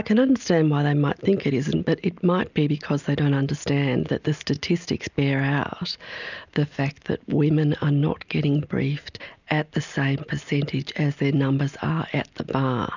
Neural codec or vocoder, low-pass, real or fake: none; 7.2 kHz; real